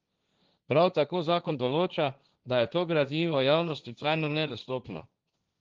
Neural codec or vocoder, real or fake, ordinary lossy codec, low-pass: codec, 16 kHz, 1.1 kbps, Voila-Tokenizer; fake; Opus, 32 kbps; 7.2 kHz